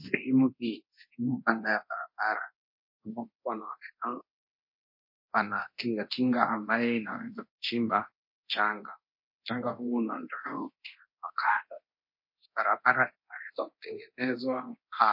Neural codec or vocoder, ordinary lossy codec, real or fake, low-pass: codec, 24 kHz, 0.9 kbps, DualCodec; MP3, 32 kbps; fake; 5.4 kHz